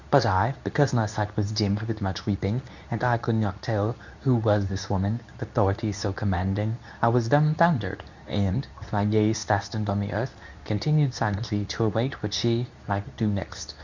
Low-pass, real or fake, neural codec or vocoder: 7.2 kHz; fake; codec, 24 kHz, 0.9 kbps, WavTokenizer, medium speech release version 2